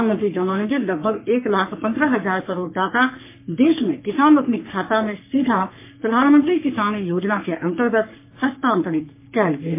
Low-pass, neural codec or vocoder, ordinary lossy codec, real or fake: 3.6 kHz; codec, 44.1 kHz, 3.4 kbps, Pupu-Codec; MP3, 24 kbps; fake